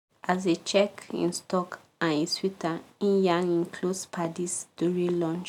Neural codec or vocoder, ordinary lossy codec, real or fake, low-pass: none; none; real; 19.8 kHz